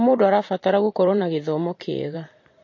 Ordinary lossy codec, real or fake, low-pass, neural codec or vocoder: MP3, 32 kbps; fake; 7.2 kHz; vocoder, 44.1 kHz, 128 mel bands every 512 samples, BigVGAN v2